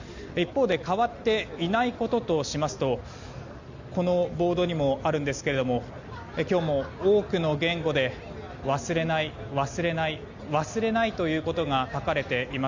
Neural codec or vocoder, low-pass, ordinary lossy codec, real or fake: none; 7.2 kHz; Opus, 64 kbps; real